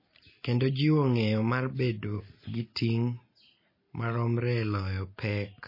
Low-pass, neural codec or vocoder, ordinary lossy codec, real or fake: 5.4 kHz; none; MP3, 24 kbps; real